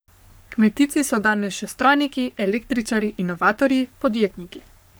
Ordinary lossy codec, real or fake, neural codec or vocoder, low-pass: none; fake; codec, 44.1 kHz, 3.4 kbps, Pupu-Codec; none